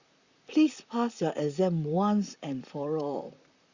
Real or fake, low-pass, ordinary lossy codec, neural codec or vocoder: fake; 7.2 kHz; Opus, 64 kbps; vocoder, 44.1 kHz, 128 mel bands, Pupu-Vocoder